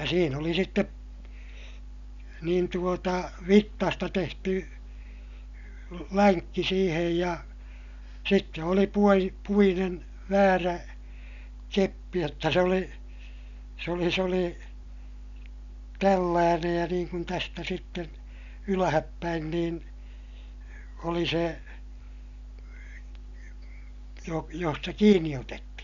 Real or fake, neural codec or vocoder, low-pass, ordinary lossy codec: real; none; 7.2 kHz; none